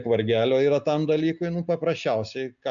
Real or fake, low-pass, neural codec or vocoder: real; 7.2 kHz; none